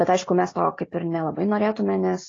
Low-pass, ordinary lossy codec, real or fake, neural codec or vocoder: 7.2 kHz; AAC, 32 kbps; real; none